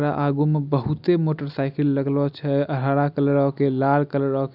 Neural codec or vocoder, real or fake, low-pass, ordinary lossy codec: none; real; 5.4 kHz; none